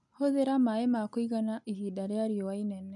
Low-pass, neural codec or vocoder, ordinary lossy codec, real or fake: 10.8 kHz; none; none; real